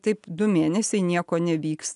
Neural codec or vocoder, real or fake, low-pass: none; real; 10.8 kHz